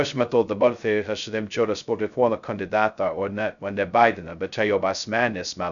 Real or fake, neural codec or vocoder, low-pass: fake; codec, 16 kHz, 0.2 kbps, FocalCodec; 7.2 kHz